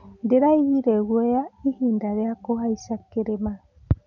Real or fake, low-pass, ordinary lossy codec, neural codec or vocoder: real; 7.2 kHz; none; none